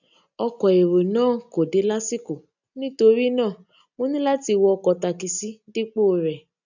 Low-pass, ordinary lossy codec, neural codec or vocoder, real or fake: 7.2 kHz; none; none; real